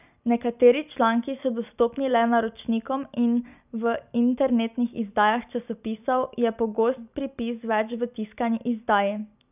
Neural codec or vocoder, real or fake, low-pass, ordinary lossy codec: none; real; 3.6 kHz; none